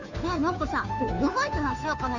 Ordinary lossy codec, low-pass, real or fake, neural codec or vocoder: none; 7.2 kHz; fake; codec, 16 kHz, 2 kbps, FunCodec, trained on Chinese and English, 25 frames a second